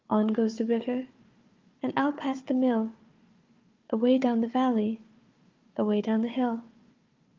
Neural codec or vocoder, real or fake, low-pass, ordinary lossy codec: codec, 16 kHz, 4 kbps, FunCodec, trained on Chinese and English, 50 frames a second; fake; 7.2 kHz; Opus, 32 kbps